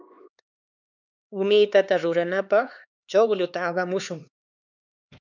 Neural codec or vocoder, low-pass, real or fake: codec, 16 kHz, 4 kbps, X-Codec, HuBERT features, trained on LibriSpeech; 7.2 kHz; fake